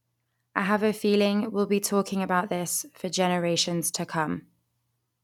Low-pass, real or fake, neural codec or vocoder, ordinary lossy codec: 19.8 kHz; real; none; none